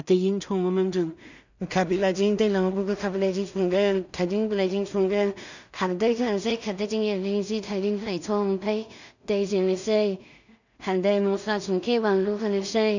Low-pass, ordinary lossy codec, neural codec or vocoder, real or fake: 7.2 kHz; none; codec, 16 kHz in and 24 kHz out, 0.4 kbps, LongCat-Audio-Codec, two codebook decoder; fake